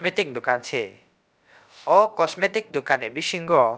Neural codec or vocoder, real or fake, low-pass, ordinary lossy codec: codec, 16 kHz, about 1 kbps, DyCAST, with the encoder's durations; fake; none; none